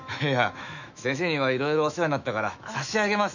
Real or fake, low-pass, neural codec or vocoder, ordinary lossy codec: fake; 7.2 kHz; autoencoder, 48 kHz, 128 numbers a frame, DAC-VAE, trained on Japanese speech; none